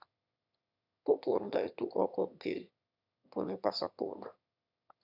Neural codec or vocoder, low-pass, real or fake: autoencoder, 22.05 kHz, a latent of 192 numbers a frame, VITS, trained on one speaker; 5.4 kHz; fake